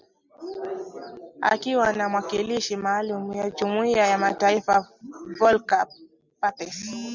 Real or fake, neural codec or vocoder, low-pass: real; none; 7.2 kHz